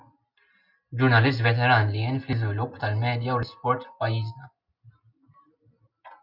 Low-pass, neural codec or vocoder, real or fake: 5.4 kHz; none; real